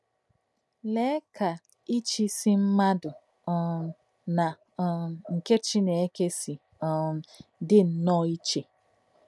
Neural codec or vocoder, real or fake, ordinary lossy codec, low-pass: none; real; none; none